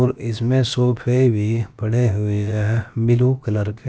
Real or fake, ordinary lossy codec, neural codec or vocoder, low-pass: fake; none; codec, 16 kHz, about 1 kbps, DyCAST, with the encoder's durations; none